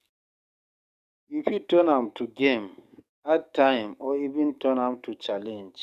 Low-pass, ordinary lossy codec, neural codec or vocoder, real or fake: 14.4 kHz; none; codec, 44.1 kHz, 7.8 kbps, DAC; fake